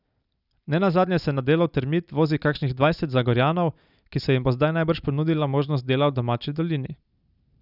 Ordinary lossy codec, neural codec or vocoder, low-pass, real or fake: none; none; 5.4 kHz; real